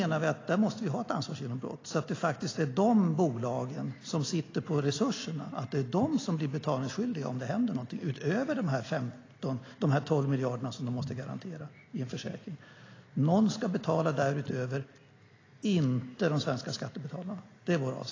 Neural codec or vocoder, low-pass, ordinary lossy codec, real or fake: none; 7.2 kHz; AAC, 32 kbps; real